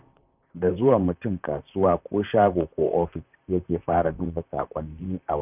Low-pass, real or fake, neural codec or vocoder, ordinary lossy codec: 3.6 kHz; fake; vocoder, 44.1 kHz, 128 mel bands, Pupu-Vocoder; none